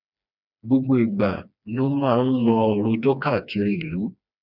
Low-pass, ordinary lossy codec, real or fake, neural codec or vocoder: 5.4 kHz; none; fake; codec, 16 kHz, 2 kbps, FreqCodec, smaller model